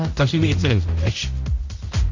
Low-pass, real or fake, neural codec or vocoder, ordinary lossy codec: 7.2 kHz; fake; codec, 16 kHz, 0.5 kbps, X-Codec, HuBERT features, trained on general audio; none